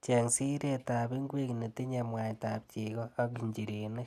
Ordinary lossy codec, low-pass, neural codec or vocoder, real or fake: none; 14.4 kHz; none; real